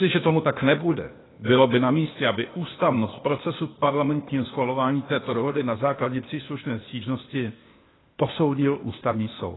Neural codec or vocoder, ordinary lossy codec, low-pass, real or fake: codec, 16 kHz, 0.8 kbps, ZipCodec; AAC, 16 kbps; 7.2 kHz; fake